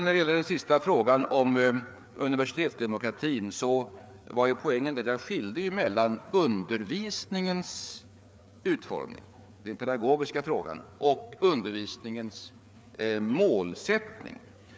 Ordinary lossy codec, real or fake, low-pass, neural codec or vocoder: none; fake; none; codec, 16 kHz, 4 kbps, FreqCodec, larger model